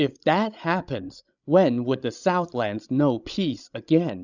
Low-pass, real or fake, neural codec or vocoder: 7.2 kHz; fake; codec, 16 kHz, 16 kbps, FreqCodec, larger model